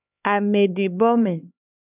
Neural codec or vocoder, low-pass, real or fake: codec, 16 kHz, 4 kbps, X-Codec, HuBERT features, trained on LibriSpeech; 3.6 kHz; fake